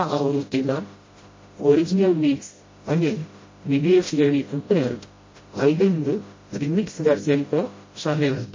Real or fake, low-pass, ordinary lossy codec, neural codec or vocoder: fake; 7.2 kHz; MP3, 32 kbps; codec, 16 kHz, 0.5 kbps, FreqCodec, smaller model